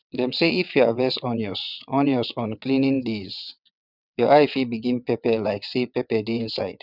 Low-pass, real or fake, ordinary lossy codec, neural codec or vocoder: 5.4 kHz; fake; none; vocoder, 22.05 kHz, 80 mel bands, WaveNeXt